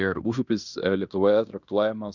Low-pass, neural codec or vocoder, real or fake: 7.2 kHz; codec, 16 kHz in and 24 kHz out, 0.9 kbps, LongCat-Audio-Codec, fine tuned four codebook decoder; fake